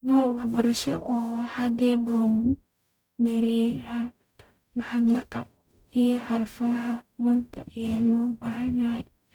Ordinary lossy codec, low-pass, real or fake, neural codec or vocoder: none; 19.8 kHz; fake; codec, 44.1 kHz, 0.9 kbps, DAC